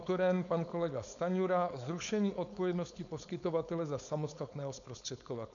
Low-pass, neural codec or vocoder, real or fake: 7.2 kHz; codec, 16 kHz, 4.8 kbps, FACodec; fake